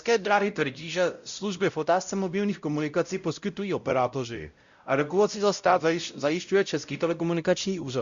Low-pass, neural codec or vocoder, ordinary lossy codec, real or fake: 7.2 kHz; codec, 16 kHz, 0.5 kbps, X-Codec, WavLM features, trained on Multilingual LibriSpeech; Opus, 64 kbps; fake